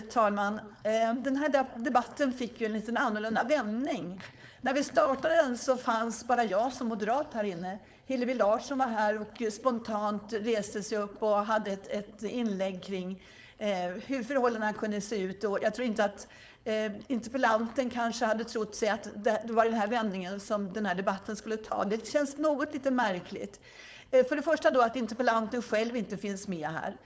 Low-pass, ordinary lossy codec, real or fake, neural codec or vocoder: none; none; fake; codec, 16 kHz, 4.8 kbps, FACodec